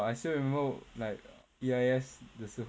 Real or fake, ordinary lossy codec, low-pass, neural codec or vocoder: real; none; none; none